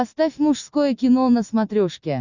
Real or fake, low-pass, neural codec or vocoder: real; 7.2 kHz; none